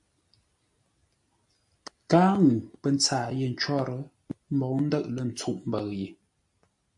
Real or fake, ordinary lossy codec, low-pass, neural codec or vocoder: real; MP3, 64 kbps; 10.8 kHz; none